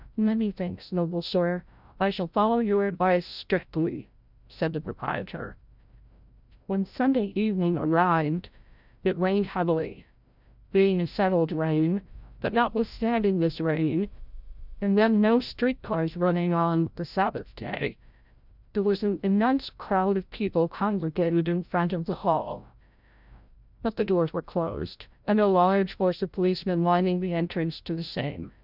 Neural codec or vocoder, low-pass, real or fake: codec, 16 kHz, 0.5 kbps, FreqCodec, larger model; 5.4 kHz; fake